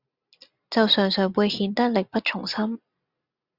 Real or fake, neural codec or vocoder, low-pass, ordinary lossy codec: real; none; 5.4 kHz; Opus, 64 kbps